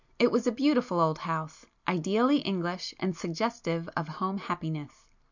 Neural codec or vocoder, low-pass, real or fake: none; 7.2 kHz; real